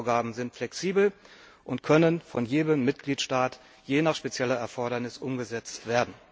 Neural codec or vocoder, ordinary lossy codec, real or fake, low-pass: none; none; real; none